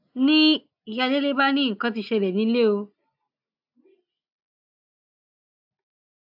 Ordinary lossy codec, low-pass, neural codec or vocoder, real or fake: AAC, 48 kbps; 5.4 kHz; none; real